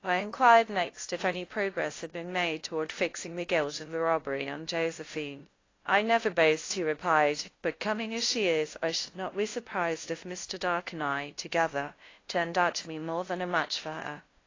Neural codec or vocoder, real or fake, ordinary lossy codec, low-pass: codec, 16 kHz, 0.5 kbps, FunCodec, trained on LibriTTS, 25 frames a second; fake; AAC, 32 kbps; 7.2 kHz